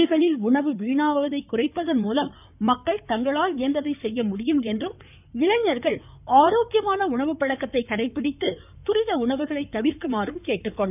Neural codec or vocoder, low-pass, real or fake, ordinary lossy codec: codec, 16 kHz in and 24 kHz out, 2.2 kbps, FireRedTTS-2 codec; 3.6 kHz; fake; none